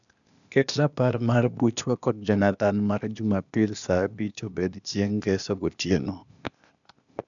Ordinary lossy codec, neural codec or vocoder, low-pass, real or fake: none; codec, 16 kHz, 0.8 kbps, ZipCodec; 7.2 kHz; fake